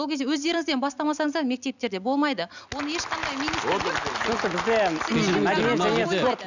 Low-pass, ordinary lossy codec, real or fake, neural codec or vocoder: 7.2 kHz; none; real; none